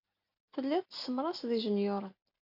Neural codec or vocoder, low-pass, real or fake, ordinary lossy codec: none; 5.4 kHz; real; AAC, 48 kbps